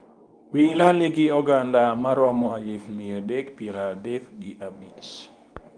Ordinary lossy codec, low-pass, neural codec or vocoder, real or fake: Opus, 32 kbps; 9.9 kHz; codec, 24 kHz, 0.9 kbps, WavTokenizer, medium speech release version 2; fake